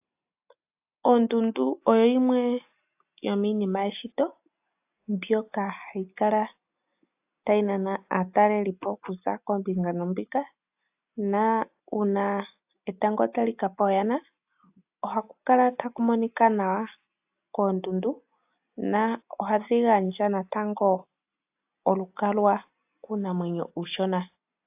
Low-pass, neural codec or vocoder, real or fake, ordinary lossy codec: 3.6 kHz; none; real; AAC, 32 kbps